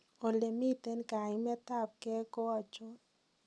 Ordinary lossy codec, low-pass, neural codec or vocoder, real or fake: none; none; none; real